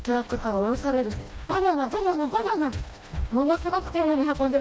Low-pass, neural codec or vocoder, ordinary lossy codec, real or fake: none; codec, 16 kHz, 1 kbps, FreqCodec, smaller model; none; fake